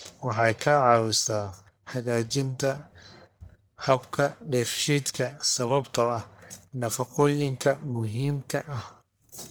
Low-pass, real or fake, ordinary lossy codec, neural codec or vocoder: none; fake; none; codec, 44.1 kHz, 1.7 kbps, Pupu-Codec